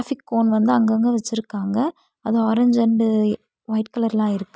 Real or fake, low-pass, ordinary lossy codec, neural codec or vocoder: real; none; none; none